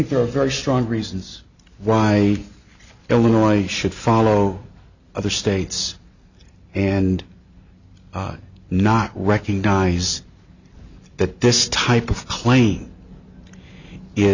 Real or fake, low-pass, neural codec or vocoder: real; 7.2 kHz; none